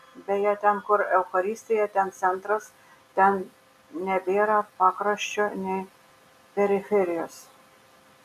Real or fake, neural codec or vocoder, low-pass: real; none; 14.4 kHz